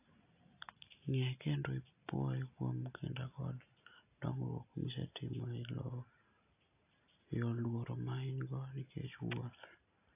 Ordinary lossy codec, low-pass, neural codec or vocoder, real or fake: AAC, 32 kbps; 3.6 kHz; none; real